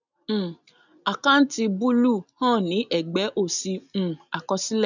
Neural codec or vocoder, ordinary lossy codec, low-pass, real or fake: none; none; 7.2 kHz; real